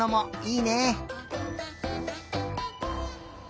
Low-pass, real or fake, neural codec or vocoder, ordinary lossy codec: none; real; none; none